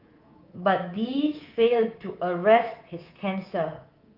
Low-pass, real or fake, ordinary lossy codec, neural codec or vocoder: 5.4 kHz; fake; Opus, 32 kbps; codec, 24 kHz, 3.1 kbps, DualCodec